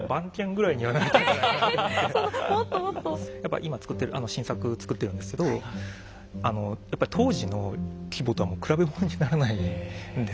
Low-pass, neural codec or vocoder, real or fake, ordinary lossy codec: none; none; real; none